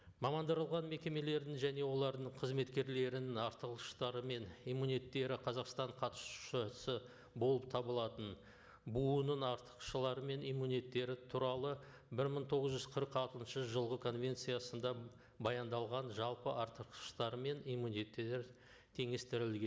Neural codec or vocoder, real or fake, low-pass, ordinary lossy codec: none; real; none; none